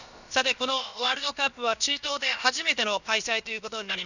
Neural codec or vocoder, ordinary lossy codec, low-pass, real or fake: codec, 16 kHz, about 1 kbps, DyCAST, with the encoder's durations; none; 7.2 kHz; fake